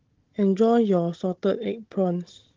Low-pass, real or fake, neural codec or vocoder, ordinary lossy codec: 7.2 kHz; fake; codec, 16 kHz, 16 kbps, FunCodec, trained on Chinese and English, 50 frames a second; Opus, 16 kbps